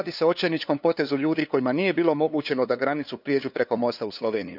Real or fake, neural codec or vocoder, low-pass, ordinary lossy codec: fake; codec, 16 kHz, 2 kbps, FunCodec, trained on LibriTTS, 25 frames a second; 5.4 kHz; none